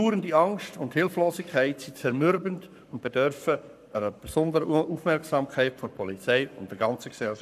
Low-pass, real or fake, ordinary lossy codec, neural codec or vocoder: 14.4 kHz; fake; none; codec, 44.1 kHz, 7.8 kbps, Pupu-Codec